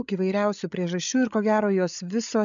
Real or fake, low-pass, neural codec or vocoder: fake; 7.2 kHz; codec, 16 kHz, 8 kbps, FreqCodec, larger model